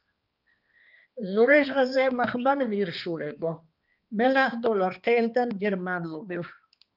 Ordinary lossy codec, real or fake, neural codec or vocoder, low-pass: Opus, 24 kbps; fake; codec, 16 kHz, 2 kbps, X-Codec, HuBERT features, trained on balanced general audio; 5.4 kHz